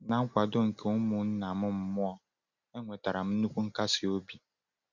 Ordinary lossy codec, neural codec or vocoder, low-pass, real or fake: Opus, 64 kbps; none; 7.2 kHz; real